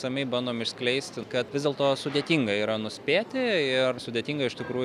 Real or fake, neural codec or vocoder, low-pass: real; none; 14.4 kHz